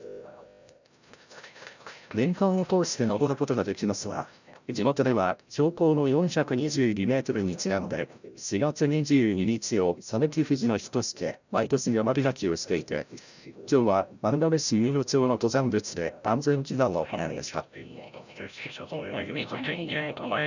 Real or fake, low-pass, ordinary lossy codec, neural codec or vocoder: fake; 7.2 kHz; none; codec, 16 kHz, 0.5 kbps, FreqCodec, larger model